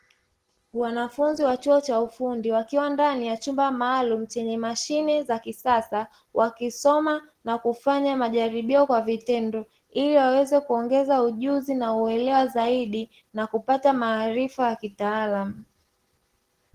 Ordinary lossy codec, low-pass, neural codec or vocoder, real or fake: Opus, 16 kbps; 14.4 kHz; none; real